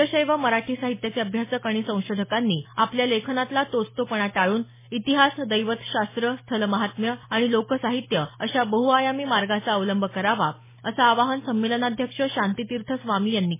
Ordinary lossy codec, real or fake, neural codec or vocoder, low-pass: MP3, 16 kbps; real; none; 3.6 kHz